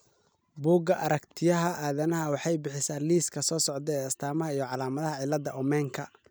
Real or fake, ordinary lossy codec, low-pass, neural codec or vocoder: real; none; none; none